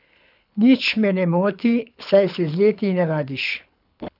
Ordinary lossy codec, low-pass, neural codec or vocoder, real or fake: none; 5.4 kHz; codec, 24 kHz, 6 kbps, HILCodec; fake